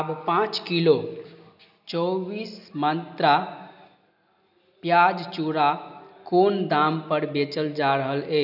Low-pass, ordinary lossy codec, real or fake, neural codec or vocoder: 5.4 kHz; none; real; none